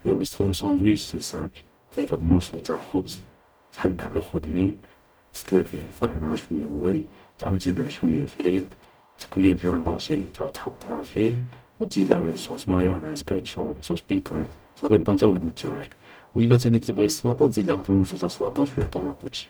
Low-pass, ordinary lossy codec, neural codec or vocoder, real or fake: none; none; codec, 44.1 kHz, 0.9 kbps, DAC; fake